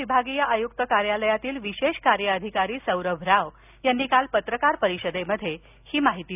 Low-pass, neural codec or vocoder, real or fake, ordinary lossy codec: 3.6 kHz; none; real; none